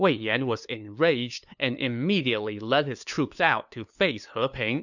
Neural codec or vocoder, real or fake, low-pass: codec, 16 kHz, 2 kbps, FunCodec, trained on LibriTTS, 25 frames a second; fake; 7.2 kHz